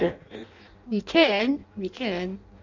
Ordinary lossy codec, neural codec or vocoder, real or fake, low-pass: none; codec, 16 kHz in and 24 kHz out, 0.6 kbps, FireRedTTS-2 codec; fake; 7.2 kHz